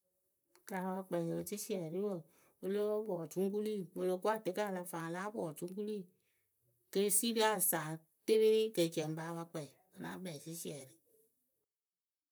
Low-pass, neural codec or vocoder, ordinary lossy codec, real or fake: none; vocoder, 44.1 kHz, 128 mel bands, Pupu-Vocoder; none; fake